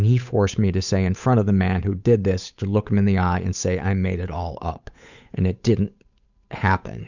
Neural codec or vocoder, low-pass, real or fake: codec, 16 kHz, 8 kbps, FunCodec, trained on Chinese and English, 25 frames a second; 7.2 kHz; fake